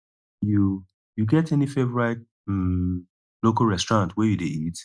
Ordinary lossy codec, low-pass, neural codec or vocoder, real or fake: none; none; none; real